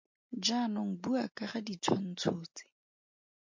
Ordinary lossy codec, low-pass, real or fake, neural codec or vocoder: MP3, 48 kbps; 7.2 kHz; real; none